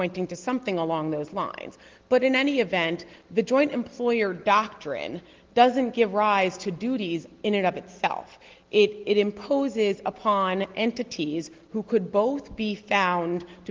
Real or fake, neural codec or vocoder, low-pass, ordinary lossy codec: real; none; 7.2 kHz; Opus, 16 kbps